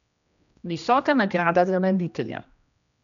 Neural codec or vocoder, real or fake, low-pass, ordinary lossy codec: codec, 16 kHz, 1 kbps, X-Codec, HuBERT features, trained on general audio; fake; 7.2 kHz; none